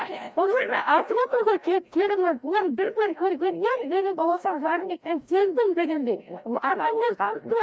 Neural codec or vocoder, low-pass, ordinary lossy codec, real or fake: codec, 16 kHz, 0.5 kbps, FreqCodec, larger model; none; none; fake